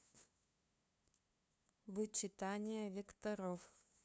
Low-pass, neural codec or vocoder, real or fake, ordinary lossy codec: none; codec, 16 kHz, 2 kbps, FunCodec, trained on LibriTTS, 25 frames a second; fake; none